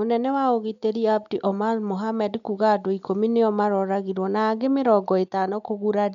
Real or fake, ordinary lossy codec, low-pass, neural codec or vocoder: real; MP3, 96 kbps; 7.2 kHz; none